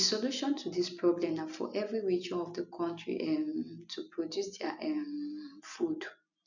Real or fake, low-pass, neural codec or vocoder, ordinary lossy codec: real; 7.2 kHz; none; none